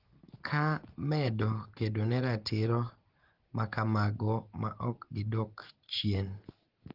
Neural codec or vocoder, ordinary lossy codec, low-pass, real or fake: none; Opus, 16 kbps; 5.4 kHz; real